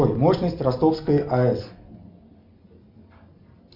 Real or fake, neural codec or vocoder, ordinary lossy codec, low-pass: real; none; MP3, 48 kbps; 5.4 kHz